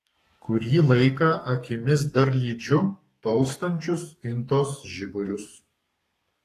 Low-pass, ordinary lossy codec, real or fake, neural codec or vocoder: 14.4 kHz; AAC, 48 kbps; fake; codec, 32 kHz, 1.9 kbps, SNAC